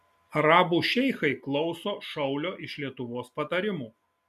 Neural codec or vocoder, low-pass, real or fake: none; 14.4 kHz; real